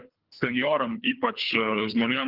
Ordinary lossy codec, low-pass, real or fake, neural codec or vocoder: Opus, 24 kbps; 5.4 kHz; fake; codec, 24 kHz, 3 kbps, HILCodec